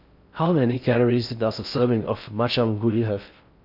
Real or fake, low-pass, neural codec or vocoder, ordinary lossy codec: fake; 5.4 kHz; codec, 16 kHz in and 24 kHz out, 0.6 kbps, FocalCodec, streaming, 4096 codes; none